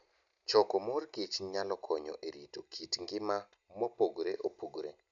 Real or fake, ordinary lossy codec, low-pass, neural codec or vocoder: real; none; 7.2 kHz; none